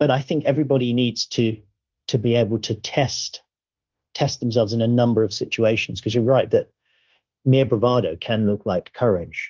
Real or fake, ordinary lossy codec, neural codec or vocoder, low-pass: fake; Opus, 32 kbps; codec, 16 kHz, 0.9 kbps, LongCat-Audio-Codec; 7.2 kHz